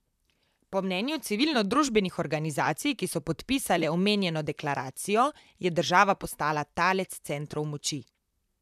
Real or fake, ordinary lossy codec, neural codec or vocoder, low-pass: fake; none; vocoder, 44.1 kHz, 128 mel bands, Pupu-Vocoder; 14.4 kHz